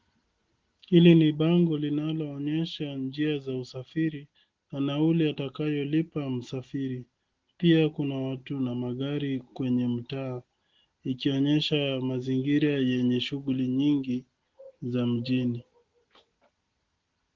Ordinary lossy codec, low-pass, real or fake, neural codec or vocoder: Opus, 16 kbps; 7.2 kHz; real; none